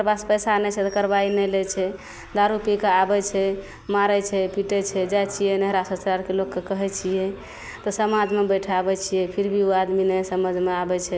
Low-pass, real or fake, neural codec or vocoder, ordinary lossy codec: none; real; none; none